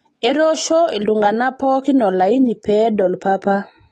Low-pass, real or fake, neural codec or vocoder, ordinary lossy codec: 19.8 kHz; fake; autoencoder, 48 kHz, 128 numbers a frame, DAC-VAE, trained on Japanese speech; AAC, 32 kbps